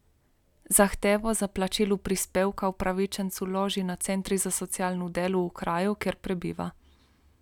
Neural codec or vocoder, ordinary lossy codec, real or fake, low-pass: none; none; real; 19.8 kHz